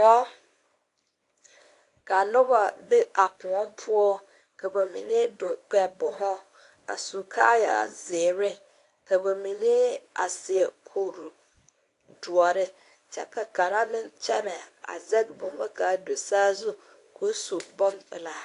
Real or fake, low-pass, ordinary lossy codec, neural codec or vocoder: fake; 10.8 kHz; AAC, 48 kbps; codec, 24 kHz, 0.9 kbps, WavTokenizer, small release